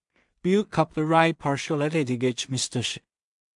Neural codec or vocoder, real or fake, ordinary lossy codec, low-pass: codec, 16 kHz in and 24 kHz out, 0.4 kbps, LongCat-Audio-Codec, two codebook decoder; fake; MP3, 64 kbps; 10.8 kHz